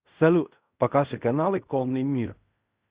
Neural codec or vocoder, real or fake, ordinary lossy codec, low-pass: codec, 16 kHz in and 24 kHz out, 0.4 kbps, LongCat-Audio-Codec, fine tuned four codebook decoder; fake; Opus, 64 kbps; 3.6 kHz